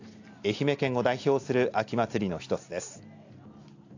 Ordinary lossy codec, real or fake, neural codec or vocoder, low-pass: AAC, 48 kbps; real; none; 7.2 kHz